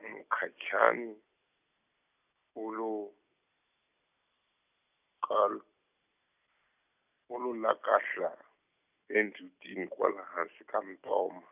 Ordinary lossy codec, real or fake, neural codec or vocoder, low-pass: none; real; none; 3.6 kHz